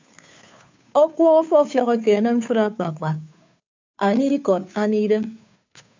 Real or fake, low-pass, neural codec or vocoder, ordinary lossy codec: fake; 7.2 kHz; codec, 16 kHz, 2 kbps, FunCodec, trained on Chinese and English, 25 frames a second; MP3, 64 kbps